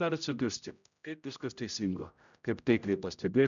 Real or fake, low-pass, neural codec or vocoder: fake; 7.2 kHz; codec, 16 kHz, 0.5 kbps, X-Codec, HuBERT features, trained on general audio